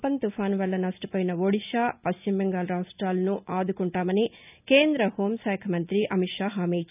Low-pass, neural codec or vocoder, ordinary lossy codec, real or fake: 3.6 kHz; none; none; real